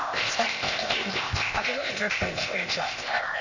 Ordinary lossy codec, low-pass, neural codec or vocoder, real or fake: none; 7.2 kHz; codec, 16 kHz, 0.8 kbps, ZipCodec; fake